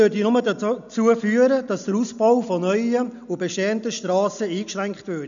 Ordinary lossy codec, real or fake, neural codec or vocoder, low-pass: none; real; none; 7.2 kHz